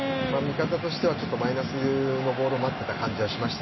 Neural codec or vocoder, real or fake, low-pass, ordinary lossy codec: none; real; 7.2 kHz; MP3, 24 kbps